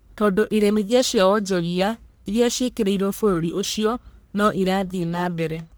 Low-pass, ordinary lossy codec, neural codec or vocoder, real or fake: none; none; codec, 44.1 kHz, 1.7 kbps, Pupu-Codec; fake